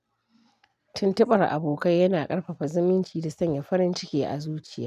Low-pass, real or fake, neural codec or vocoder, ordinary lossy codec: 14.4 kHz; real; none; none